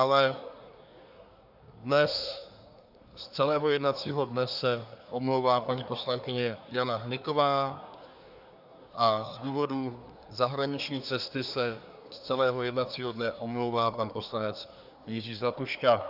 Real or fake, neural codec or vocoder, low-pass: fake; codec, 24 kHz, 1 kbps, SNAC; 5.4 kHz